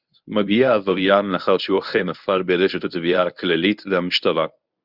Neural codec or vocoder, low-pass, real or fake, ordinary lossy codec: codec, 24 kHz, 0.9 kbps, WavTokenizer, medium speech release version 1; 5.4 kHz; fake; Opus, 64 kbps